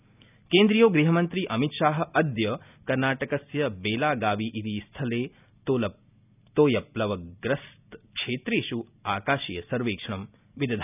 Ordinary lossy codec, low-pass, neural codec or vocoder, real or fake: none; 3.6 kHz; none; real